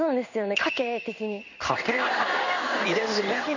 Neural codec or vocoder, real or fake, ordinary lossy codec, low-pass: codec, 16 kHz in and 24 kHz out, 1 kbps, XY-Tokenizer; fake; none; 7.2 kHz